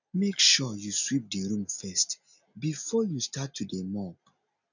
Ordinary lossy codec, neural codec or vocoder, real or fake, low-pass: none; none; real; 7.2 kHz